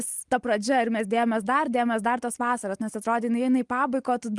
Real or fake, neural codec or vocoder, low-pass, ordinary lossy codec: real; none; 10.8 kHz; Opus, 24 kbps